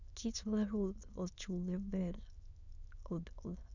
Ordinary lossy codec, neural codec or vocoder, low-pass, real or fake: none; autoencoder, 22.05 kHz, a latent of 192 numbers a frame, VITS, trained on many speakers; 7.2 kHz; fake